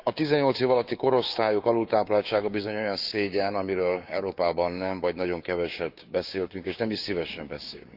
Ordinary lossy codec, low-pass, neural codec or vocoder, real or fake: none; 5.4 kHz; codec, 16 kHz, 6 kbps, DAC; fake